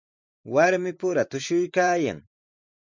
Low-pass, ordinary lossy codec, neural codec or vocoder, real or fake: 7.2 kHz; MP3, 64 kbps; vocoder, 44.1 kHz, 128 mel bands every 512 samples, BigVGAN v2; fake